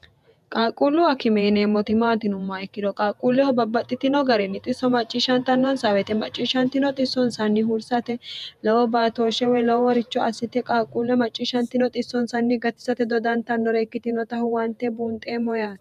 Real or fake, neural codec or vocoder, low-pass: fake; vocoder, 48 kHz, 128 mel bands, Vocos; 14.4 kHz